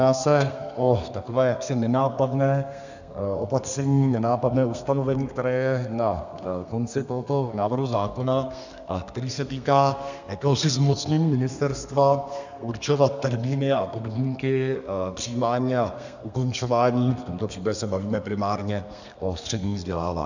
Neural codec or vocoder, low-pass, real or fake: codec, 32 kHz, 1.9 kbps, SNAC; 7.2 kHz; fake